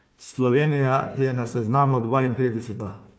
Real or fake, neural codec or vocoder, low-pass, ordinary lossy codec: fake; codec, 16 kHz, 1 kbps, FunCodec, trained on Chinese and English, 50 frames a second; none; none